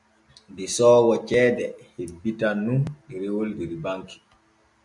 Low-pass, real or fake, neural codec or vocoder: 10.8 kHz; real; none